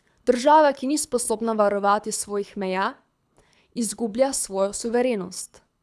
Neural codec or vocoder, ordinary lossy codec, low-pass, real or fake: codec, 24 kHz, 6 kbps, HILCodec; none; none; fake